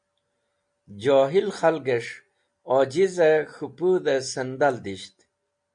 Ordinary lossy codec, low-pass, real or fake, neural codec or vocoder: MP3, 48 kbps; 9.9 kHz; real; none